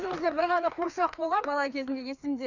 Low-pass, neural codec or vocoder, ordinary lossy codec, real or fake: 7.2 kHz; codec, 16 kHz, 2 kbps, FreqCodec, larger model; none; fake